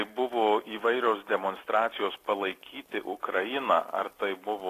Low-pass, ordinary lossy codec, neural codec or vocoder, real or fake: 14.4 kHz; AAC, 48 kbps; none; real